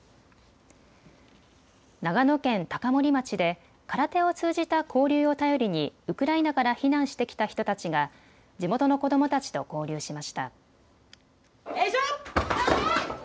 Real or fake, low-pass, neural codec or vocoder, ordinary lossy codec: real; none; none; none